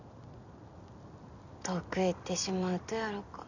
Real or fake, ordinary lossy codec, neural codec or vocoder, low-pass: real; none; none; 7.2 kHz